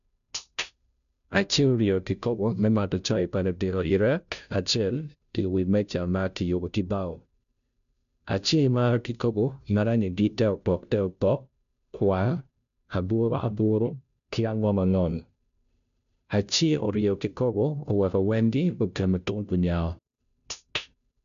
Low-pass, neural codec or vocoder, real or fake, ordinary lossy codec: 7.2 kHz; codec, 16 kHz, 0.5 kbps, FunCodec, trained on Chinese and English, 25 frames a second; fake; none